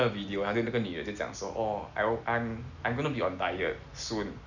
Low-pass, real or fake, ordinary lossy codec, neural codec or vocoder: 7.2 kHz; real; none; none